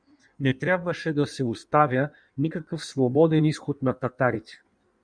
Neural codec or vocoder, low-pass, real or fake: codec, 16 kHz in and 24 kHz out, 1.1 kbps, FireRedTTS-2 codec; 9.9 kHz; fake